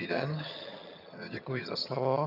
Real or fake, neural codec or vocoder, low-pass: fake; vocoder, 22.05 kHz, 80 mel bands, HiFi-GAN; 5.4 kHz